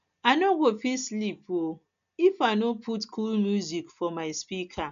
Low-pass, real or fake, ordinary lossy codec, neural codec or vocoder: 7.2 kHz; real; none; none